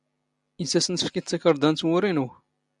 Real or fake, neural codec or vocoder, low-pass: real; none; 10.8 kHz